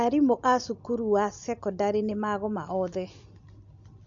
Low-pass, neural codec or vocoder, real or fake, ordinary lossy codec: 7.2 kHz; none; real; AAC, 64 kbps